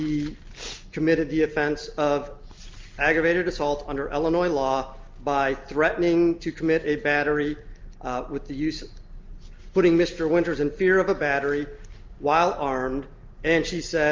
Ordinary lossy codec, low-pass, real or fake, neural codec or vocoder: Opus, 24 kbps; 7.2 kHz; real; none